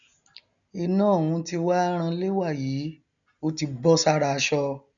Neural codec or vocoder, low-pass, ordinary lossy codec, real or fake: none; 7.2 kHz; none; real